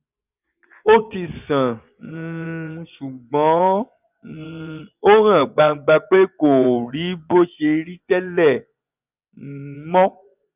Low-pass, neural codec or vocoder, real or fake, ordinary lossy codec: 3.6 kHz; vocoder, 24 kHz, 100 mel bands, Vocos; fake; none